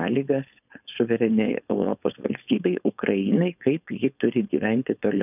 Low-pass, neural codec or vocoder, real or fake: 3.6 kHz; codec, 16 kHz, 4.8 kbps, FACodec; fake